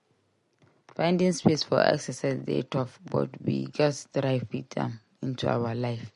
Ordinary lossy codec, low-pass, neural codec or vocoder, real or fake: MP3, 48 kbps; 10.8 kHz; none; real